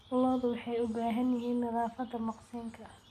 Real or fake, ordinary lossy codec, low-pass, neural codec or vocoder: real; none; 14.4 kHz; none